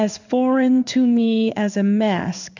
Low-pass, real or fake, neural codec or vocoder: 7.2 kHz; fake; codec, 16 kHz in and 24 kHz out, 1 kbps, XY-Tokenizer